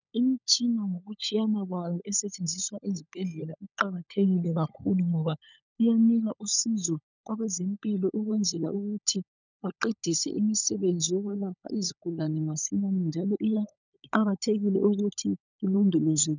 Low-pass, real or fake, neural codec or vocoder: 7.2 kHz; fake; codec, 16 kHz, 16 kbps, FunCodec, trained on LibriTTS, 50 frames a second